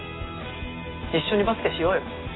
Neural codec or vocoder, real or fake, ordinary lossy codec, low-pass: none; real; AAC, 16 kbps; 7.2 kHz